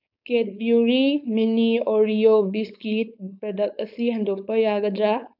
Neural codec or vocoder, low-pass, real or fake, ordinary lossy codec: codec, 16 kHz, 4.8 kbps, FACodec; 5.4 kHz; fake; none